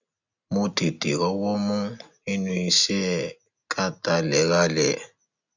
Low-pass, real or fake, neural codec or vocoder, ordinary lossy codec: 7.2 kHz; real; none; none